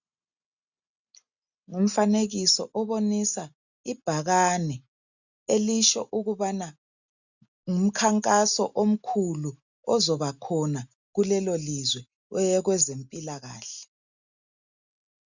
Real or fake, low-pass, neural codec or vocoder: real; 7.2 kHz; none